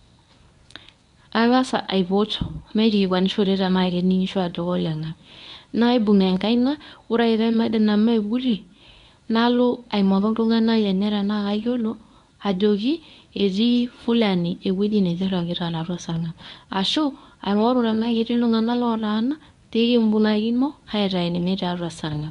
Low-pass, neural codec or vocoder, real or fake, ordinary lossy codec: 10.8 kHz; codec, 24 kHz, 0.9 kbps, WavTokenizer, medium speech release version 1; fake; none